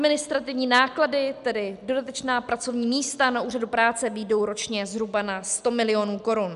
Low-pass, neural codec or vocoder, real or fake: 10.8 kHz; none; real